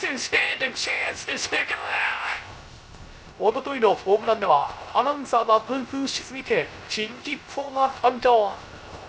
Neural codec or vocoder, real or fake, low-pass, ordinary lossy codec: codec, 16 kHz, 0.3 kbps, FocalCodec; fake; none; none